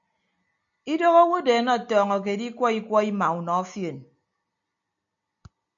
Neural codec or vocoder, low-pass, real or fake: none; 7.2 kHz; real